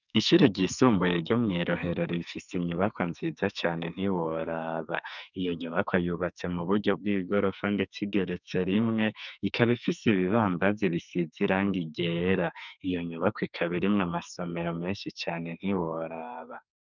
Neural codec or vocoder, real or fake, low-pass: codec, 44.1 kHz, 2.6 kbps, SNAC; fake; 7.2 kHz